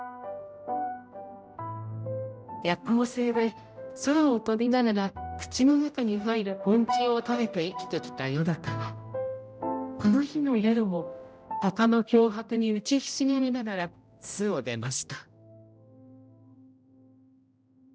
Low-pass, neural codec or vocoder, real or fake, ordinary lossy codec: none; codec, 16 kHz, 0.5 kbps, X-Codec, HuBERT features, trained on general audio; fake; none